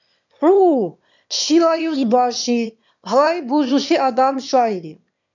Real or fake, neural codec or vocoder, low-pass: fake; autoencoder, 22.05 kHz, a latent of 192 numbers a frame, VITS, trained on one speaker; 7.2 kHz